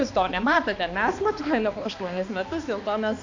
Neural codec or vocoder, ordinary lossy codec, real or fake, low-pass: codec, 16 kHz, 2 kbps, X-Codec, HuBERT features, trained on balanced general audio; AAC, 48 kbps; fake; 7.2 kHz